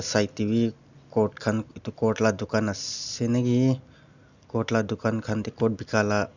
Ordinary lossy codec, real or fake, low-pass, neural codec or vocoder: none; real; 7.2 kHz; none